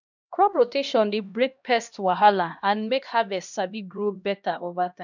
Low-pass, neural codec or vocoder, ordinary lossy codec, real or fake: 7.2 kHz; codec, 16 kHz, 1 kbps, X-Codec, HuBERT features, trained on LibriSpeech; none; fake